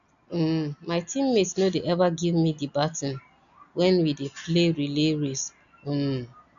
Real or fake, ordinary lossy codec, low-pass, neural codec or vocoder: real; none; 7.2 kHz; none